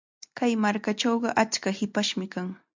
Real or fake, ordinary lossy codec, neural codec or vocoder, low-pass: real; MP3, 64 kbps; none; 7.2 kHz